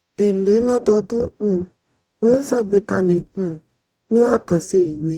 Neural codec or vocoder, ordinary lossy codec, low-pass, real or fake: codec, 44.1 kHz, 0.9 kbps, DAC; Opus, 64 kbps; 19.8 kHz; fake